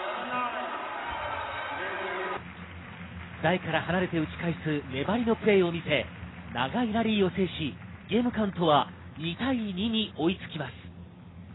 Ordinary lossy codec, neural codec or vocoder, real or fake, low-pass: AAC, 16 kbps; none; real; 7.2 kHz